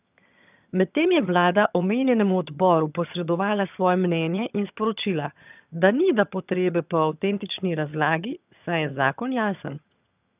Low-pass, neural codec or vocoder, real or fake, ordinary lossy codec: 3.6 kHz; vocoder, 22.05 kHz, 80 mel bands, HiFi-GAN; fake; none